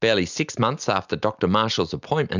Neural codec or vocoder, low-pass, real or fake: none; 7.2 kHz; real